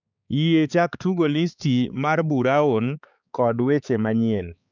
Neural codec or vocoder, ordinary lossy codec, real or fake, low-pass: codec, 16 kHz, 4 kbps, X-Codec, HuBERT features, trained on balanced general audio; none; fake; 7.2 kHz